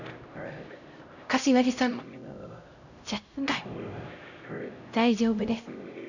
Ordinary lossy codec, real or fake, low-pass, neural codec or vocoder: none; fake; 7.2 kHz; codec, 16 kHz, 0.5 kbps, X-Codec, HuBERT features, trained on LibriSpeech